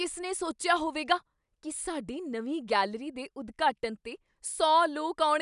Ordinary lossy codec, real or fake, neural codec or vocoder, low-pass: none; real; none; 10.8 kHz